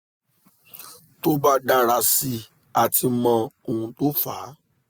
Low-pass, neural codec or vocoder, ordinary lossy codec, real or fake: none; none; none; real